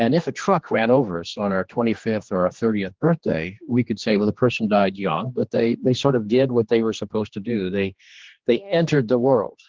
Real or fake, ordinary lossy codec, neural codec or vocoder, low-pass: fake; Opus, 16 kbps; codec, 16 kHz, 2 kbps, X-Codec, HuBERT features, trained on general audio; 7.2 kHz